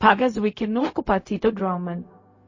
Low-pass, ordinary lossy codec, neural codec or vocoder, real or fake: 7.2 kHz; MP3, 32 kbps; codec, 16 kHz, 0.4 kbps, LongCat-Audio-Codec; fake